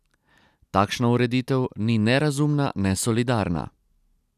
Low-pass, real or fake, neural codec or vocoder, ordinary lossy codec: 14.4 kHz; real; none; none